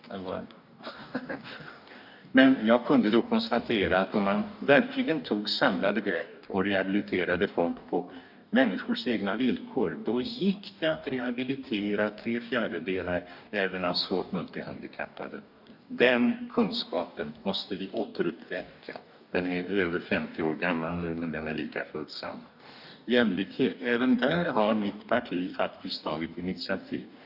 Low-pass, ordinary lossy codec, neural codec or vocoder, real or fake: 5.4 kHz; none; codec, 44.1 kHz, 2.6 kbps, DAC; fake